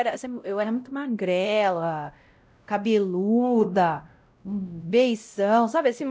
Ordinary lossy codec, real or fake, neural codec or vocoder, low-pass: none; fake; codec, 16 kHz, 0.5 kbps, X-Codec, WavLM features, trained on Multilingual LibriSpeech; none